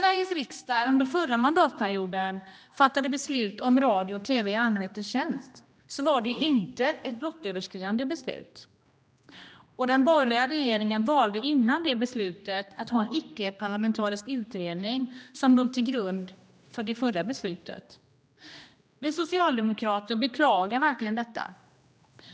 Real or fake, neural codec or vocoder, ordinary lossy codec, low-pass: fake; codec, 16 kHz, 1 kbps, X-Codec, HuBERT features, trained on general audio; none; none